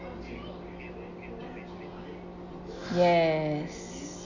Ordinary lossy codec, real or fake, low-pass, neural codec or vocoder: none; real; 7.2 kHz; none